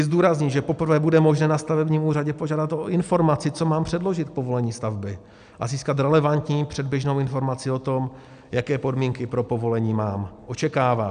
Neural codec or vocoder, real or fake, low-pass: none; real; 9.9 kHz